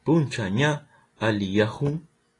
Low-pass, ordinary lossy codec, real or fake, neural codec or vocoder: 10.8 kHz; AAC, 32 kbps; real; none